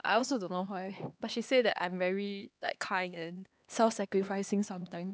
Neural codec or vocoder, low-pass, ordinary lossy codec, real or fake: codec, 16 kHz, 2 kbps, X-Codec, HuBERT features, trained on LibriSpeech; none; none; fake